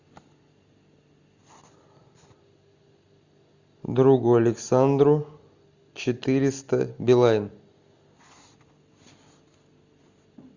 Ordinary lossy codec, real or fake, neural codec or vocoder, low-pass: Opus, 64 kbps; real; none; 7.2 kHz